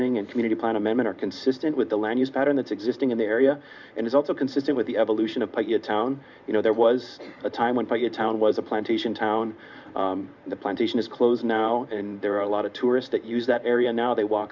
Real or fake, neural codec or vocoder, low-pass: fake; vocoder, 44.1 kHz, 128 mel bands every 512 samples, BigVGAN v2; 7.2 kHz